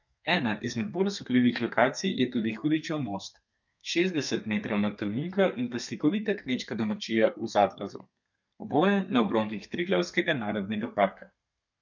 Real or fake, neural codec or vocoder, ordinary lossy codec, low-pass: fake; codec, 32 kHz, 1.9 kbps, SNAC; none; 7.2 kHz